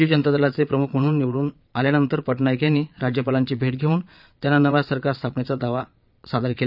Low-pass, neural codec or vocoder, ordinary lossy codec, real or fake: 5.4 kHz; vocoder, 22.05 kHz, 80 mel bands, Vocos; none; fake